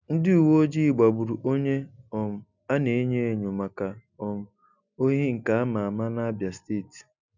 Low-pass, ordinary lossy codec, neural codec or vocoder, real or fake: 7.2 kHz; none; none; real